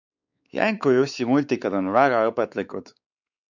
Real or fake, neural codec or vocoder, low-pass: fake; codec, 16 kHz, 4 kbps, X-Codec, WavLM features, trained on Multilingual LibriSpeech; 7.2 kHz